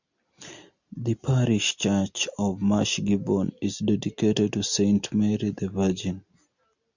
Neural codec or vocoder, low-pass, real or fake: none; 7.2 kHz; real